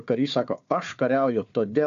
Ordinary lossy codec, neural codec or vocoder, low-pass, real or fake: AAC, 64 kbps; codec, 16 kHz, 4 kbps, FunCodec, trained on Chinese and English, 50 frames a second; 7.2 kHz; fake